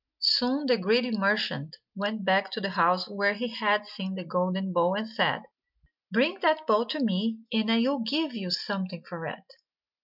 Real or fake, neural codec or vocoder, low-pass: real; none; 5.4 kHz